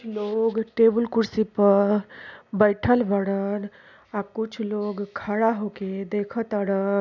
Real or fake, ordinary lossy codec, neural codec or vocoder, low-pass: real; none; none; 7.2 kHz